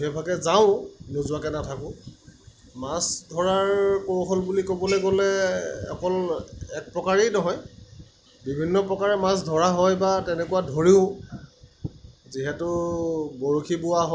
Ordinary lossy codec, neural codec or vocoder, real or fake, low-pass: none; none; real; none